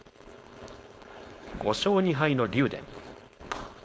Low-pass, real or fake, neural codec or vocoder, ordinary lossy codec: none; fake; codec, 16 kHz, 4.8 kbps, FACodec; none